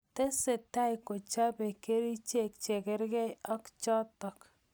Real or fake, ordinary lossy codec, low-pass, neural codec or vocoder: real; none; none; none